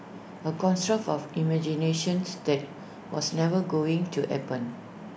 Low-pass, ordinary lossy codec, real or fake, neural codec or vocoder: none; none; real; none